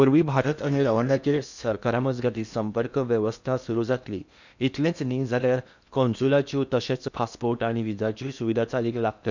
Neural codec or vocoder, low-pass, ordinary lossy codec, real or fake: codec, 16 kHz in and 24 kHz out, 0.8 kbps, FocalCodec, streaming, 65536 codes; 7.2 kHz; none; fake